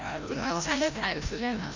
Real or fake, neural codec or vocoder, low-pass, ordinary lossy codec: fake; codec, 16 kHz, 0.5 kbps, FreqCodec, larger model; 7.2 kHz; MP3, 64 kbps